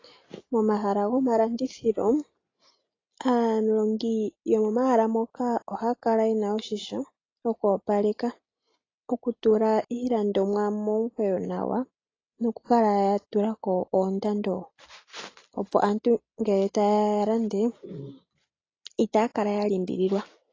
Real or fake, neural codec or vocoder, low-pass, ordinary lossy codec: real; none; 7.2 kHz; AAC, 32 kbps